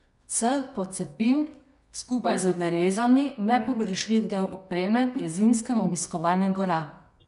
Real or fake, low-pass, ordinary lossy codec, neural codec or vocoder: fake; 10.8 kHz; none; codec, 24 kHz, 0.9 kbps, WavTokenizer, medium music audio release